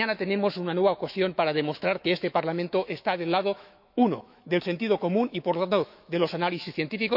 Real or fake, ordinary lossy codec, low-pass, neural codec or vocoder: fake; none; 5.4 kHz; autoencoder, 48 kHz, 128 numbers a frame, DAC-VAE, trained on Japanese speech